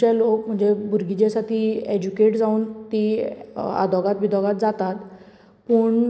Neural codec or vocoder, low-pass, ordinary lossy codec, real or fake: none; none; none; real